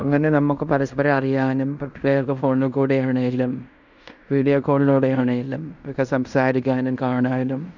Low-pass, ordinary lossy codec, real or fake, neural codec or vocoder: 7.2 kHz; none; fake; codec, 16 kHz in and 24 kHz out, 0.9 kbps, LongCat-Audio-Codec, fine tuned four codebook decoder